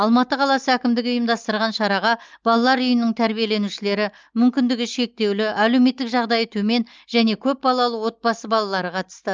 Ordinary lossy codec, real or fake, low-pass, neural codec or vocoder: Opus, 24 kbps; real; 7.2 kHz; none